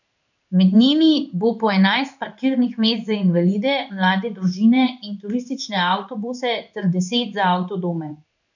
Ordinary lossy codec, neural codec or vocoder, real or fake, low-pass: none; codec, 16 kHz in and 24 kHz out, 1 kbps, XY-Tokenizer; fake; 7.2 kHz